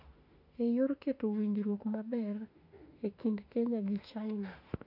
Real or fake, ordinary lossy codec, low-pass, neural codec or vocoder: fake; none; 5.4 kHz; autoencoder, 48 kHz, 32 numbers a frame, DAC-VAE, trained on Japanese speech